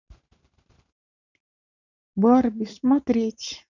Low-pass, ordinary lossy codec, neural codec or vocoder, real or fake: 7.2 kHz; none; vocoder, 44.1 kHz, 128 mel bands every 512 samples, BigVGAN v2; fake